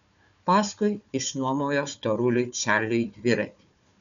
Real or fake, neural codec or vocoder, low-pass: fake; codec, 16 kHz, 4 kbps, FunCodec, trained on Chinese and English, 50 frames a second; 7.2 kHz